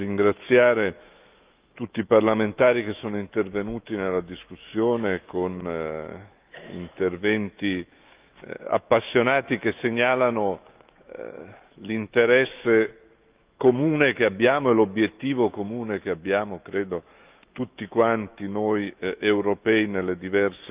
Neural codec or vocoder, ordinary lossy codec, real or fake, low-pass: autoencoder, 48 kHz, 128 numbers a frame, DAC-VAE, trained on Japanese speech; Opus, 24 kbps; fake; 3.6 kHz